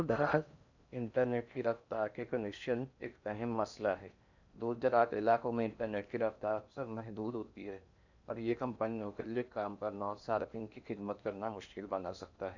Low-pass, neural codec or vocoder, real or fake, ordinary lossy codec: 7.2 kHz; codec, 16 kHz in and 24 kHz out, 0.8 kbps, FocalCodec, streaming, 65536 codes; fake; none